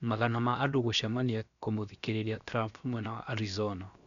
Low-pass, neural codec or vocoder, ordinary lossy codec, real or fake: 7.2 kHz; codec, 16 kHz, 0.7 kbps, FocalCodec; none; fake